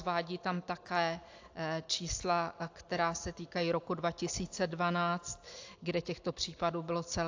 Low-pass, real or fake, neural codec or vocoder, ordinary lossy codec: 7.2 kHz; real; none; AAC, 48 kbps